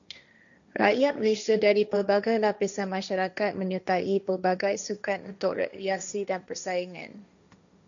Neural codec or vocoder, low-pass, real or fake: codec, 16 kHz, 1.1 kbps, Voila-Tokenizer; 7.2 kHz; fake